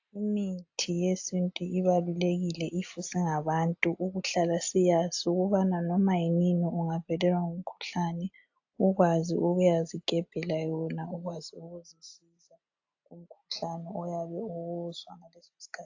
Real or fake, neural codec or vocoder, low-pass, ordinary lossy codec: real; none; 7.2 kHz; AAC, 48 kbps